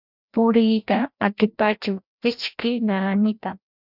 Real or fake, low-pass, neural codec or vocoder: fake; 5.4 kHz; codec, 16 kHz, 1 kbps, FreqCodec, larger model